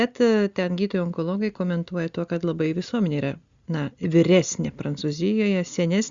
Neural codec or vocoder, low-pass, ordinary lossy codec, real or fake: none; 7.2 kHz; Opus, 64 kbps; real